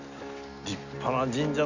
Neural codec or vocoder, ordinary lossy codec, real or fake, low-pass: none; none; real; 7.2 kHz